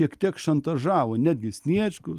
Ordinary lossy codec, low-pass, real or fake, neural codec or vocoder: Opus, 32 kbps; 14.4 kHz; real; none